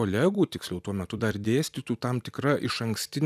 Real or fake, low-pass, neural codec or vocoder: fake; 14.4 kHz; vocoder, 44.1 kHz, 128 mel bands every 256 samples, BigVGAN v2